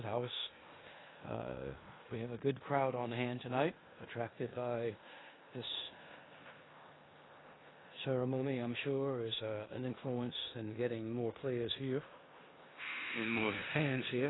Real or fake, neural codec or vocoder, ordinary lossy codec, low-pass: fake; codec, 16 kHz in and 24 kHz out, 0.9 kbps, LongCat-Audio-Codec, four codebook decoder; AAC, 16 kbps; 7.2 kHz